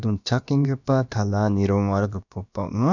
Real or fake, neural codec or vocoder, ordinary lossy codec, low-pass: fake; codec, 16 kHz, about 1 kbps, DyCAST, with the encoder's durations; none; 7.2 kHz